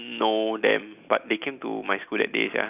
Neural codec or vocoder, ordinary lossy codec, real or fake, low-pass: none; none; real; 3.6 kHz